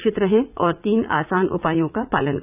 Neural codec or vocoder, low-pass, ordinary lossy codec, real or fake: vocoder, 44.1 kHz, 80 mel bands, Vocos; 3.6 kHz; none; fake